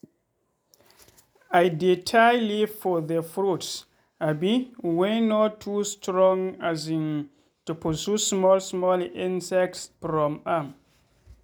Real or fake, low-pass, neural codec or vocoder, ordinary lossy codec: real; none; none; none